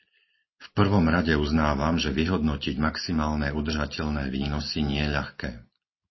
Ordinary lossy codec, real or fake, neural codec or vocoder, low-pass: MP3, 24 kbps; real; none; 7.2 kHz